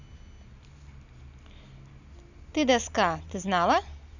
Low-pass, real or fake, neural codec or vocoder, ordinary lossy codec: 7.2 kHz; real; none; none